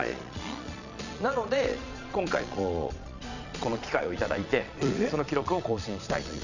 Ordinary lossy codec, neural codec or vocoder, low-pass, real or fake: none; vocoder, 22.05 kHz, 80 mel bands, Vocos; 7.2 kHz; fake